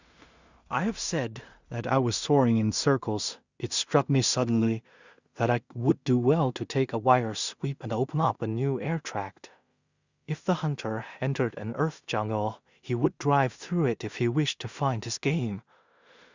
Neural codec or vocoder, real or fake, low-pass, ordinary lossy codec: codec, 16 kHz in and 24 kHz out, 0.4 kbps, LongCat-Audio-Codec, two codebook decoder; fake; 7.2 kHz; Opus, 64 kbps